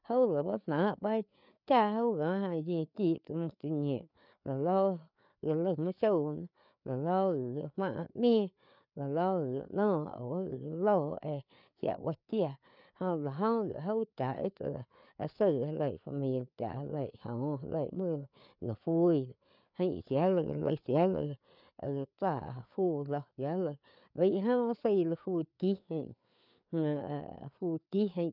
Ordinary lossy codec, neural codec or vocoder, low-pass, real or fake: none; codec, 16 kHz, 4 kbps, FreqCodec, larger model; 5.4 kHz; fake